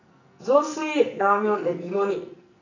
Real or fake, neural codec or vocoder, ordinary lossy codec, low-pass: fake; codec, 44.1 kHz, 2.6 kbps, SNAC; none; 7.2 kHz